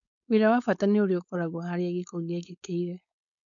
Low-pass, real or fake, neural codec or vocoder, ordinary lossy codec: 7.2 kHz; fake; codec, 16 kHz, 4.8 kbps, FACodec; none